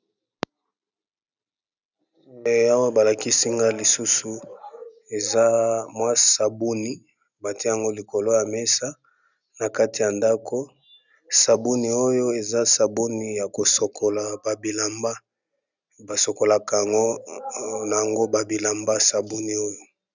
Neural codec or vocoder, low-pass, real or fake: none; 7.2 kHz; real